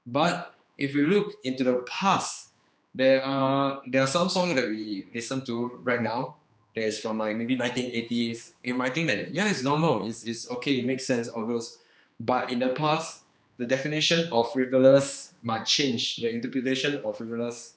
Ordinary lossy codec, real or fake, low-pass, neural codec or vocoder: none; fake; none; codec, 16 kHz, 2 kbps, X-Codec, HuBERT features, trained on general audio